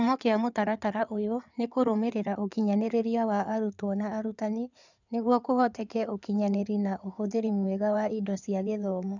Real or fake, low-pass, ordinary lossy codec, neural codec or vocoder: fake; 7.2 kHz; none; codec, 16 kHz in and 24 kHz out, 2.2 kbps, FireRedTTS-2 codec